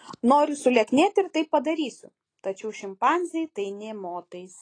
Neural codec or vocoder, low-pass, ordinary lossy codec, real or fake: none; 9.9 kHz; AAC, 32 kbps; real